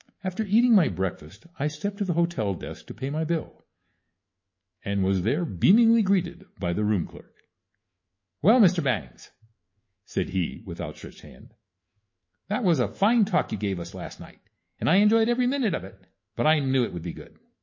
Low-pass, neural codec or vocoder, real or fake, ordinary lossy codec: 7.2 kHz; autoencoder, 48 kHz, 128 numbers a frame, DAC-VAE, trained on Japanese speech; fake; MP3, 32 kbps